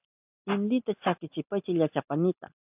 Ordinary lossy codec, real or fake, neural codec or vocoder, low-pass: AAC, 32 kbps; real; none; 3.6 kHz